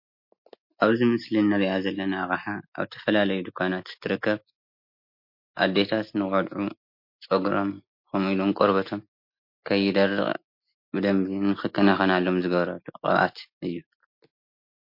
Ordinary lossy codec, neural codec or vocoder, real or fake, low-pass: MP3, 32 kbps; none; real; 5.4 kHz